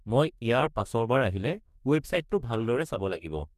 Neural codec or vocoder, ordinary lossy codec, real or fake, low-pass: codec, 44.1 kHz, 2.6 kbps, DAC; none; fake; 14.4 kHz